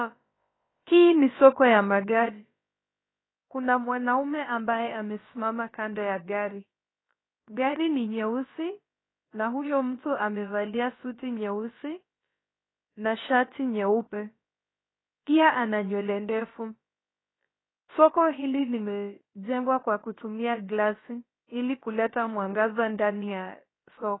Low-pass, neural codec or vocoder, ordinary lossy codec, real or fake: 7.2 kHz; codec, 16 kHz, about 1 kbps, DyCAST, with the encoder's durations; AAC, 16 kbps; fake